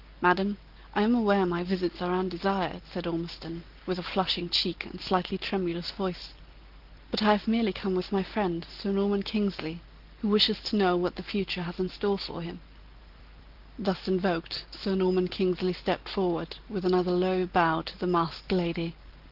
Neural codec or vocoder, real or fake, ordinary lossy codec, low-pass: none; real; Opus, 16 kbps; 5.4 kHz